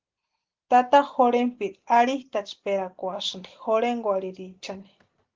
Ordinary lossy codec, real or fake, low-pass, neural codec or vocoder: Opus, 16 kbps; real; 7.2 kHz; none